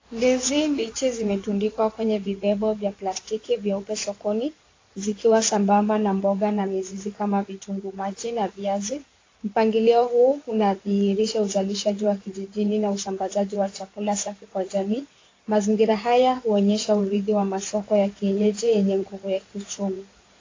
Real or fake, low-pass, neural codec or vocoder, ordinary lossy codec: fake; 7.2 kHz; codec, 16 kHz in and 24 kHz out, 2.2 kbps, FireRedTTS-2 codec; AAC, 32 kbps